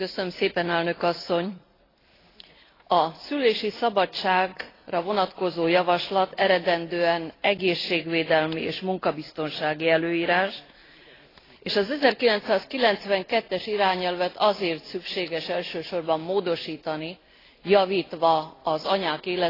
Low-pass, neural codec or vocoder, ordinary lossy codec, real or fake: 5.4 kHz; none; AAC, 24 kbps; real